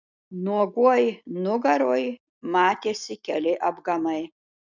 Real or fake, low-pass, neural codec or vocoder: real; 7.2 kHz; none